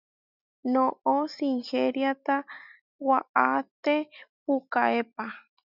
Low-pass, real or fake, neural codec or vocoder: 5.4 kHz; real; none